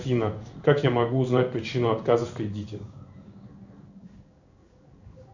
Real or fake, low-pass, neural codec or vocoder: fake; 7.2 kHz; codec, 16 kHz in and 24 kHz out, 1 kbps, XY-Tokenizer